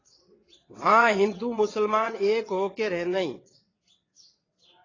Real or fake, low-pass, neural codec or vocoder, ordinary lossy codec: fake; 7.2 kHz; vocoder, 22.05 kHz, 80 mel bands, WaveNeXt; AAC, 32 kbps